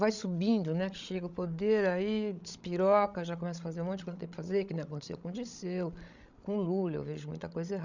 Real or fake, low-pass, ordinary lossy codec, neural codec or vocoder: fake; 7.2 kHz; none; codec, 16 kHz, 16 kbps, FreqCodec, larger model